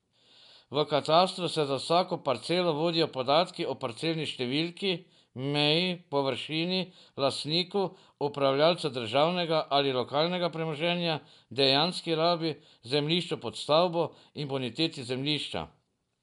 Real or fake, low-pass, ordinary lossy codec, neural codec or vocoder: real; 10.8 kHz; none; none